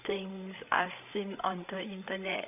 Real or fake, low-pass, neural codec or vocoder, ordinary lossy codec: fake; 3.6 kHz; codec, 16 kHz, 8 kbps, FreqCodec, larger model; none